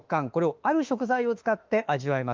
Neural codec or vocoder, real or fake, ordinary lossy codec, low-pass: autoencoder, 48 kHz, 32 numbers a frame, DAC-VAE, trained on Japanese speech; fake; Opus, 32 kbps; 7.2 kHz